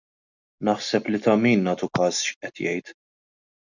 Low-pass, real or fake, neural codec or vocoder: 7.2 kHz; real; none